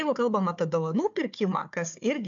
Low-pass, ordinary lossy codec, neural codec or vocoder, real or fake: 7.2 kHz; AAC, 64 kbps; codec, 16 kHz, 4 kbps, FunCodec, trained on Chinese and English, 50 frames a second; fake